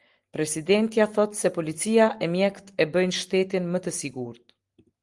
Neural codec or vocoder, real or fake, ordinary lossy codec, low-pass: none; real; Opus, 24 kbps; 10.8 kHz